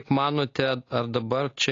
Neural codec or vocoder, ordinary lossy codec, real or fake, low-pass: none; AAC, 32 kbps; real; 7.2 kHz